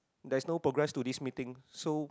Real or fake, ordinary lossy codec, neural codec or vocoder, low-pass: real; none; none; none